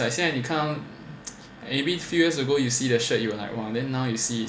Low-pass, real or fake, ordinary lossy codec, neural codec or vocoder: none; real; none; none